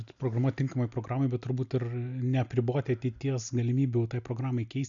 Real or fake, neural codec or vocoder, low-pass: real; none; 7.2 kHz